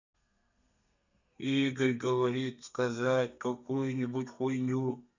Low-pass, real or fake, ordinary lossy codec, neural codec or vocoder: 7.2 kHz; fake; none; codec, 32 kHz, 1.9 kbps, SNAC